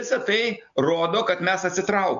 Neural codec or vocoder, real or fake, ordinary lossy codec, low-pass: none; real; MP3, 64 kbps; 7.2 kHz